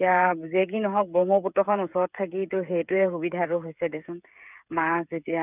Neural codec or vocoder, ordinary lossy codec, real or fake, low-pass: codec, 16 kHz, 8 kbps, FreqCodec, smaller model; none; fake; 3.6 kHz